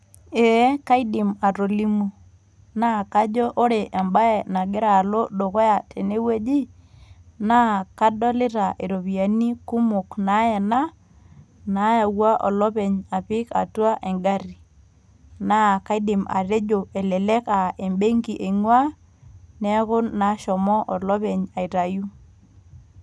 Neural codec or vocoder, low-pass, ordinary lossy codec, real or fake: none; none; none; real